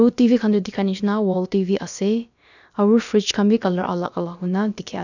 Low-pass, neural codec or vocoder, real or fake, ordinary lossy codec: 7.2 kHz; codec, 16 kHz, about 1 kbps, DyCAST, with the encoder's durations; fake; none